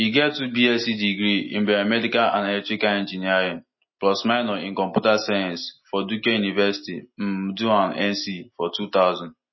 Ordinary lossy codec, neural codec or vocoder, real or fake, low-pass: MP3, 24 kbps; none; real; 7.2 kHz